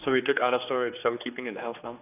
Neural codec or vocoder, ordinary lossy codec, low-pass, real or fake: codec, 16 kHz, 2 kbps, X-Codec, HuBERT features, trained on general audio; none; 3.6 kHz; fake